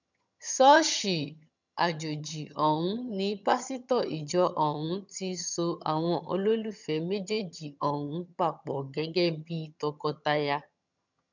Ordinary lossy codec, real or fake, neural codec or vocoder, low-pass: none; fake; vocoder, 22.05 kHz, 80 mel bands, HiFi-GAN; 7.2 kHz